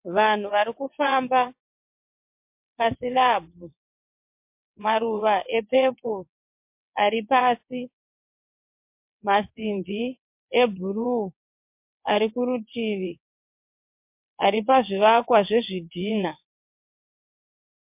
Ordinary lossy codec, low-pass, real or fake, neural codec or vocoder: MP3, 32 kbps; 3.6 kHz; fake; vocoder, 22.05 kHz, 80 mel bands, WaveNeXt